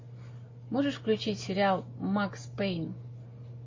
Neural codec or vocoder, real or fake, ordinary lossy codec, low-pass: none; real; MP3, 32 kbps; 7.2 kHz